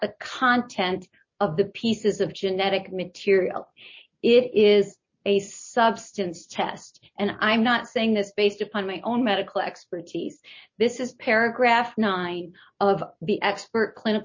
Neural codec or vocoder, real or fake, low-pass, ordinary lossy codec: none; real; 7.2 kHz; MP3, 32 kbps